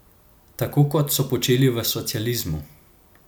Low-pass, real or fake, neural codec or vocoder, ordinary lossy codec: none; real; none; none